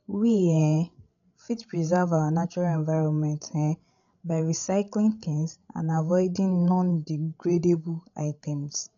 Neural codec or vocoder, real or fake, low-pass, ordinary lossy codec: codec, 16 kHz, 16 kbps, FreqCodec, larger model; fake; 7.2 kHz; none